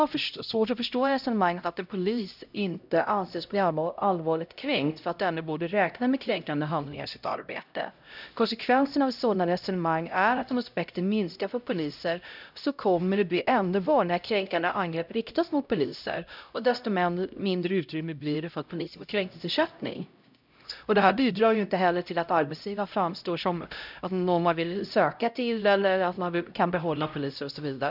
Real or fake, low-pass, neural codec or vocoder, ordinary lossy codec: fake; 5.4 kHz; codec, 16 kHz, 0.5 kbps, X-Codec, HuBERT features, trained on LibriSpeech; none